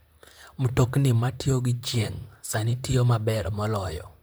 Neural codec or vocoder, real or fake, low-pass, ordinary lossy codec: vocoder, 44.1 kHz, 128 mel bands, Pupu-Vocoder; fake; none; none